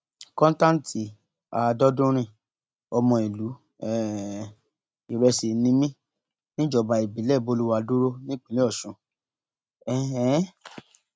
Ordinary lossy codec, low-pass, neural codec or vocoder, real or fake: none; none; none; real